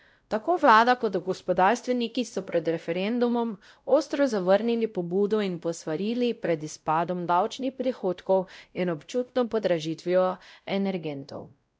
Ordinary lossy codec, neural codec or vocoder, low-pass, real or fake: none; codec, 16 kHz, 0.5 kbps, X-Codec, WavLM features, trained on Multilingual LibriSpeech; none; fake